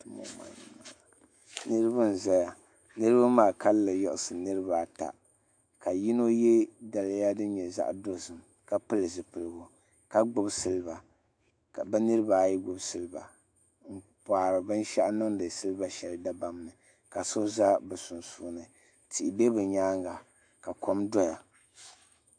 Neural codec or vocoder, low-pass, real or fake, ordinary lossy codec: autoencoder, 48 kHz, 128 numbers a frame, DAC-VAE, trained on Japanese speech; 9.9 kHz; fake; AAC, 64 kbps